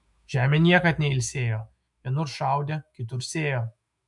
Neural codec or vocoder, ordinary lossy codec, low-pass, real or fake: autoencoder, 48 kHz, 128 numbers a frame, DAC-VAE, trained on Japanese speech; MP3, 96 kbps; 10.8 kHz; fake